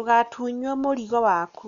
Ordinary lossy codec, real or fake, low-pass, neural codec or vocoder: MP3, 96 kbps; real; 7.2 kHz; none